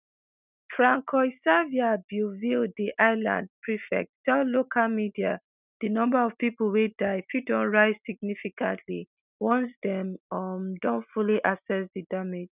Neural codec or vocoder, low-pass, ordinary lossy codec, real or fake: none; 3.6 kHz; none; real